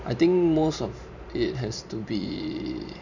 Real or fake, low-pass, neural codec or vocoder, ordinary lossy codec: fake; 7.2 kHz; vocoder, 44.1 kHz, 128 mel bands every 512 samples, BigVGAN v2; none